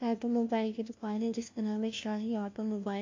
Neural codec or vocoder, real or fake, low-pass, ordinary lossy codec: codec, 16 kHz, 1 kbps, FunCodec, trained on LibriTTS, 50 frames a second; fake; 7.2 kHz; MP3, 48 kbps